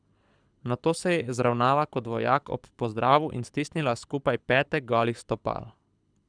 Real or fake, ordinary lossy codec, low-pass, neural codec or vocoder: fake; none; 9.9 kHz; codec, 24 kHz, 6 kbps, HILCodec